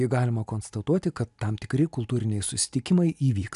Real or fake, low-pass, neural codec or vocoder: real; 10.8 kHz; none